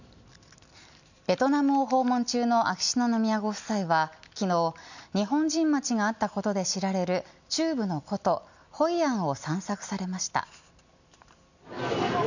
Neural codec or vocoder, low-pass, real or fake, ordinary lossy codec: none; 7.2 kHz; real; none